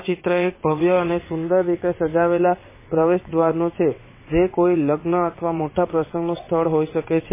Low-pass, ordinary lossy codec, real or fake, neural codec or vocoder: 3.6 kHz; MP3, 16 kbps; fake; vocoder, 22.05 kHz, 80 mel bands, WaveNeXt